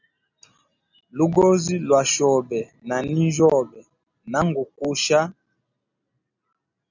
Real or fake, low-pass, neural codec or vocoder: real; 7.2 kHz; none